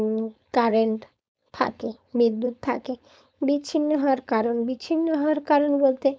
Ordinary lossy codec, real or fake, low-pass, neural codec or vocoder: none; fake; none; codec, 16 kHz, 4.8 kbps, FACodec